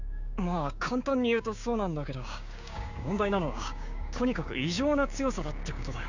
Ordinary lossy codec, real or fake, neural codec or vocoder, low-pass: none; fake; codec, 16 kHz, 6 kbps, DAC; 7.2 kHz